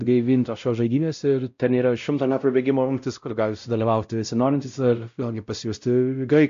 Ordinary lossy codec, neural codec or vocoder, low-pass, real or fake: AAC, 64 kbps; codec, 16 kHz, 0.5 kbps, X-Codec, WavLM features, trained on Multilingual LibriSpeech; 7.2 kHz; fake